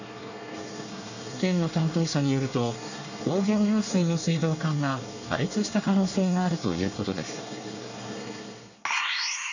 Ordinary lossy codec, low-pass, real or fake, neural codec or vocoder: none; 7.2 kHz; fake; codec, 24 kHz, 1 kbps, SNAC